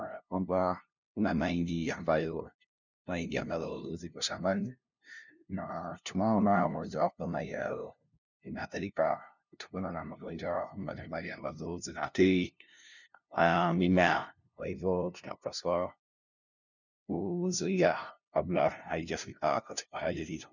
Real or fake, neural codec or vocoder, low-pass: fake; codec, 16 kHz, 0.5 kbps, FunCodec, trained on LibriTTS, 25 frames a second; 7.2 kHz